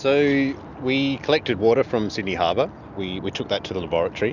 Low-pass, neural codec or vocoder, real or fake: 7.2 kHz; none; real